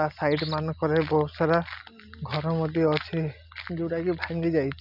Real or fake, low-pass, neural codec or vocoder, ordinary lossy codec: real; 5.4 kHz; none; none